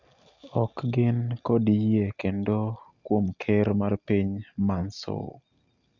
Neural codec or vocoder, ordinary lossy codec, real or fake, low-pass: none; Opus, 64 kbps; real; 7.2 kHz